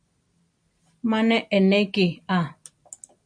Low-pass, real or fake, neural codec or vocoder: 9.9 kHz; real; none